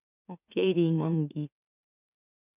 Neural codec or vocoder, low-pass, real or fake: autoencoder, 44.1 kHz, a latent of 192 numbers a frame, MeloTTS; 3.6 kHz; fake